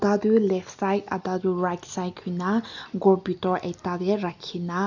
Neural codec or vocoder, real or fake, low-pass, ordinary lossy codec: none; real; 7.2 kHz; none